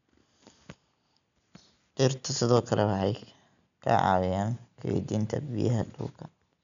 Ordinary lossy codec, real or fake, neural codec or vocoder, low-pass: none; real; none; 7.2 kHz